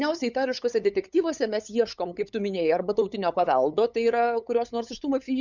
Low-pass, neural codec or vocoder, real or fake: 7.2 kHz; codec, 16 kHz, 8 kbps, FunCodec, trained on LibriTTS, 25 frames a second; fake